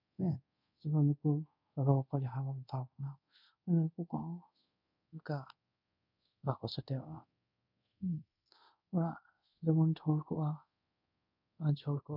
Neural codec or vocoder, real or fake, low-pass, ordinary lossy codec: codec, 24 kHz, 0.5 kbps, DualCodec; fake; 5.4 kHz; none